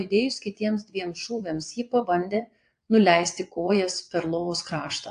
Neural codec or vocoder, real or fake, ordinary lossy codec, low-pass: vocoder, 22.05 kHz, 80 mel bands, WaveNeXt; fake; AAC, 64 kbps; 9.9 kHz